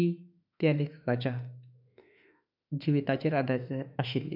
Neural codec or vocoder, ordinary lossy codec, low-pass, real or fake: autoencoder, 48 kHz, 32 numbers a frame, DAC-VAE, trained on Japanese speech; none; 5.4 kHz; fake